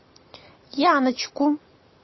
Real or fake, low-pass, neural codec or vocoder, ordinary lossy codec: real; 7.2 kHz; none; MP3, 24 kbps